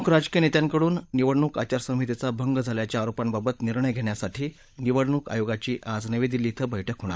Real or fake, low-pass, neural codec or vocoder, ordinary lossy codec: fake; none; codec, 16 kHz, 16 kbps, FunCodec, trained on LibriTTS, 50 frames a second; none